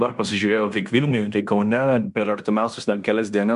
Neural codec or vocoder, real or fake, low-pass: codec, 16 kHz in and 24 kHz out, 0.9 kbps, LongCat-Audio-Codec, fine tuned four codebook decoder; fake; 10.8 kHz